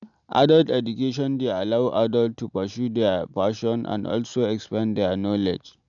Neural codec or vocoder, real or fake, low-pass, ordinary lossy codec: none; real; 7.2 kHz; none